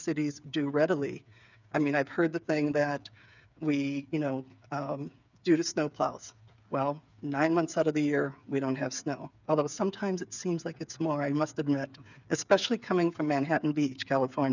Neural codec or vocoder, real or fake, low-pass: codec, 16 kHz, 8 kbps, FreqCodec, smaller model; fake; 7.2 kHz